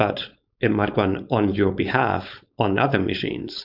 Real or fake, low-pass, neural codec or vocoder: fake; 5.4 kHz; codec, 16 kHz, 4.8 kbps, FACodec